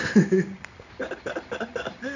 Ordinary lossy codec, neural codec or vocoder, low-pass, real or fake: none; codec, 16 kHz in and 24 kHz out, 1 kbps, XY-Tokenizer; 7.2 kHz; fake